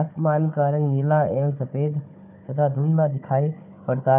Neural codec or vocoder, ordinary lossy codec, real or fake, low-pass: codec, 16 kHz, 4 kbps, FunCodec, trained on Chinese and English, 50 frames a second; none; fake; 3.6 kHz